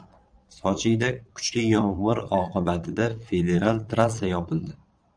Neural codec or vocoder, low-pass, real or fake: vocoder, 22.05 kHz, 80 mel bands, Vocos; 9.9 kHz; fake